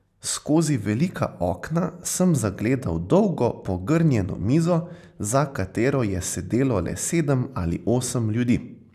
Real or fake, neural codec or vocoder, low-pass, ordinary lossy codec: real; none; 14.4 kHz; none